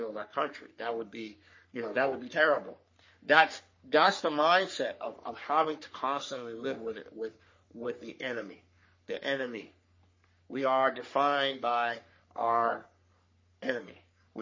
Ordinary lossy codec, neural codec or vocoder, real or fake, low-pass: MP3, 32 kbps; codec, 44.1 kHz, 3.4 kbps, Pupu-Codec; fake; 7.2 kHz